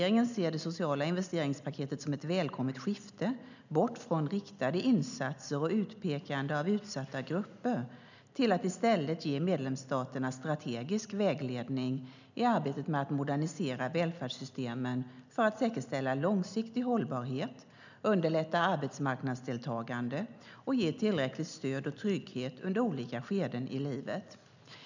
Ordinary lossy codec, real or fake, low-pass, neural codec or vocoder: none; real; 7.2 kHz; none